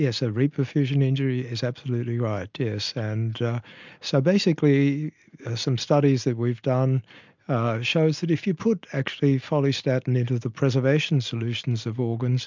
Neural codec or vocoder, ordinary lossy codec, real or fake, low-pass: none; MP3, 64 kbps; real; 7.2 kHz